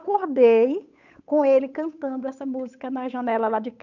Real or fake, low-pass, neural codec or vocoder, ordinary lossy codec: fake; 7.2 kHz; codec, 16 kHz, 8 kbps, FunCodec, trained on Chinese and English, 25 frames a second; none